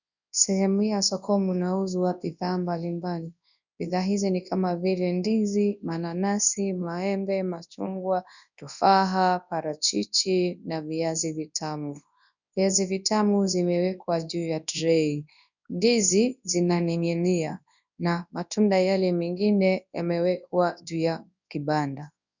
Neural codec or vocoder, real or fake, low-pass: codec, 24 kHz, 0.9 kbps, WavTokenizer, large speech release; fake; 7.2 kHz